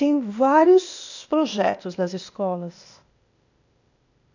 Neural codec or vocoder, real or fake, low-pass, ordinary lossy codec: codec, 16 kHz, 0.8 kbps, ZipCodec; fake; 7.2 kHz; none